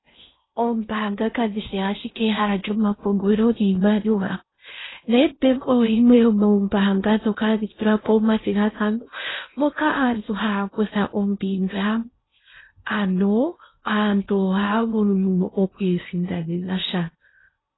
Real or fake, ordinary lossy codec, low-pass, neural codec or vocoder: fake; AAC, 16 kbps; 7.2 kHz; codec, 16 kHz in and 24 kHz out, 0.6 kbps, FocalCodec, streaming, 4096 codes